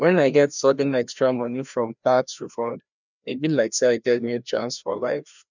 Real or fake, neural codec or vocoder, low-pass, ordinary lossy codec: fake; codec, 16 kHz, 1 kbps, FreqCodec, larger model; 7.2 kHz; none